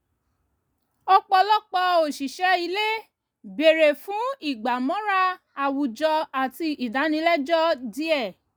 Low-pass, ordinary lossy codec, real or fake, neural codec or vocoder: none; none; real; none